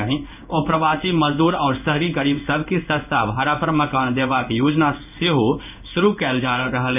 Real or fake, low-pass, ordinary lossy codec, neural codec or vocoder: fake; 3.6 kHz; none; codec, 16 kHz in and 24 kHz out, 1 kbps, XY-Tokenizer